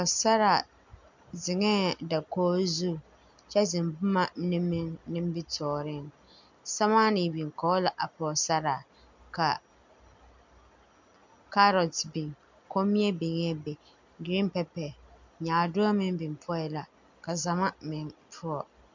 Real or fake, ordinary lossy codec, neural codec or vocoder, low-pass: real; MP3, 64 kbps; none; 7.2 kHz